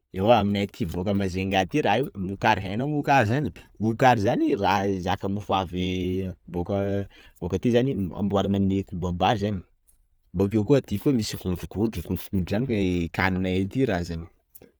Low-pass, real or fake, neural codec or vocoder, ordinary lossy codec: none; real; none; none